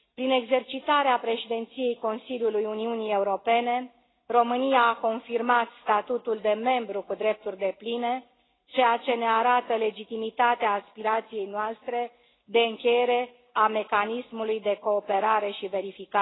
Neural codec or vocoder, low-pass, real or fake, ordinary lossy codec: none; 7.2 kHz; real; AAC, 16 kbps